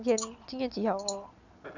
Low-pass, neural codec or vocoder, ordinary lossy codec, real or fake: 7.2 kHz; none; none; real